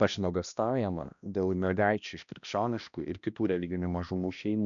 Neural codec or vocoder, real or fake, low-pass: codec, 16 kHz, 1 kbps, X-Codec, HuBERT features, trained on balanced general audio; fake; 7.2 kHz